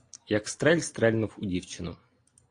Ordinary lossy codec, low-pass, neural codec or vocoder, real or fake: AAC, 48 kbps; 9.9 kHz; none; real